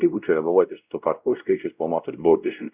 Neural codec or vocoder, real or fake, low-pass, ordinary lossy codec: codec, 16 kHz, 0.5 kbps, X-Codec, WavLM features, trained on Multilingual LibriSpeech; fake; 3.6 kHz; Opus, 64 kbps